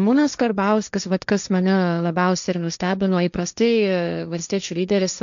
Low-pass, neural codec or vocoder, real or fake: 7.2 kHz; codec, 16 kHz, 1.1 kbps, Voila-Tokenizer; fake